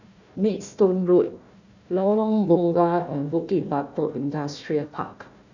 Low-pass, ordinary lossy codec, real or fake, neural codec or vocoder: 7.2 kHz; none; fake; codec, 16 kHz, 1 kbps, FunCodec, trained on Chinese and English, 50 frames a second